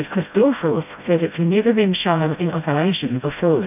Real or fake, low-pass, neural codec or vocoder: fake; 3.6 kHz; codec, 16 kHz, 0.5 kbps, FreqCodec, smaller model